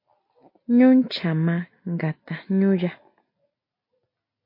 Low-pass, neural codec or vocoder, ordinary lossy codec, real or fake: 5.4 kHz; none; MP3, 32 kbps; real